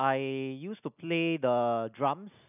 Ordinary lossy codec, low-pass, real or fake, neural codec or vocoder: none; 3.6 kHz; real; none